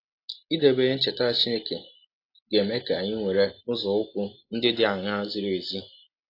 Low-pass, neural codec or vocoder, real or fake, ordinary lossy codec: 5.4 kHz; none; real; AAC, 32 kbps